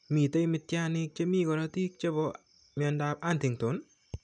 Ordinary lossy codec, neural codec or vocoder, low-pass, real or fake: none; none; 9.9 kHz; real